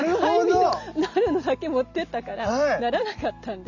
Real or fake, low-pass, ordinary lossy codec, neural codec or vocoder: real; 7.2 kHz; none; none